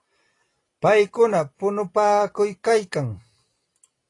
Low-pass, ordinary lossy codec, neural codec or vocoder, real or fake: 10.8 kHz; AAC, 32 kbps; none; real